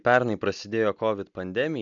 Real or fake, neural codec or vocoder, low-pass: real; none; 7.2 kHz